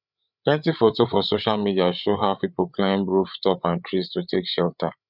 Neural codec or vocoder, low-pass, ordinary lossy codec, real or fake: codec, 16 kHz, 8 kbps, FreqCodec, larger model; 5.4 kHz; none; fake